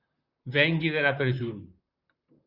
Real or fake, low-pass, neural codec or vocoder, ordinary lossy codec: fake; 5.4 kHz; vocoder, 44.1 kHz, 128 mel bands, Pupu-Vocoder; Opus, 64 kbps